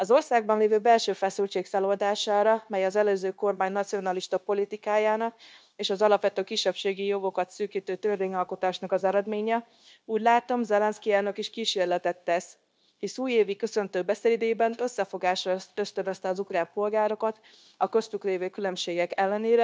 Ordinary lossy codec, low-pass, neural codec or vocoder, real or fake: none; none; codec, 16 kHz, 0.9 kbps, LongCat-Audio-Codec; fake